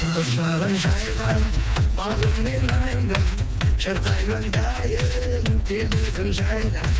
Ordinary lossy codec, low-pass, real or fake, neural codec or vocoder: none; none; fake; codec, 16 kHz, 2 kbps, FreqCodec, smaller model